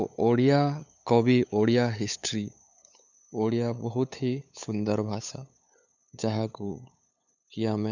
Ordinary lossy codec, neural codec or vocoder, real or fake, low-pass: none; codec, 16 kHz, 8 kbps, FunCodec, trained on LibriTTS, 25 frames a second; fake; 7.2 kHz